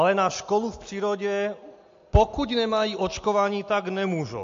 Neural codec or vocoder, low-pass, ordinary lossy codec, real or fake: none; 7.2 kHz; MP3, 48 kbps; real